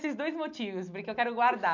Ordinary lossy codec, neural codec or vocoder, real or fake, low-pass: none; none; real; 7.2 kHz